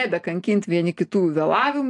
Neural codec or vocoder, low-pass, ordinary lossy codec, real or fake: none; 10.8 kHz; AAC, 48 kbps; real